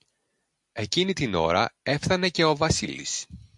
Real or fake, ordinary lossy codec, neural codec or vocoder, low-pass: real; MP3, 64 kbps; none; 10.8 kHz